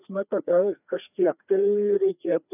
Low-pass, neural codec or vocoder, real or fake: 3.6 kHz; codec, 16 kHz, 2 kbps, FreqCodec, larger model; fake